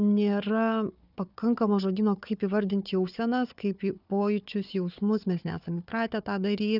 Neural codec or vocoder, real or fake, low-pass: codec, 16 kHz, 4 kbps, FunCodec, trained on Chinese and English, 50 frames a second; fake; 5.4 kHz